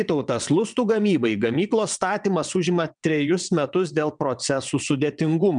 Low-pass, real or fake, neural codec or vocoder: 9.9 kHz; fake; vocoder, 22.05 kHz, 80 mel bands, WaveNeXt